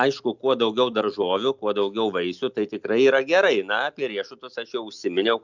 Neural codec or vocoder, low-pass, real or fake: none; 7.2 kHz; real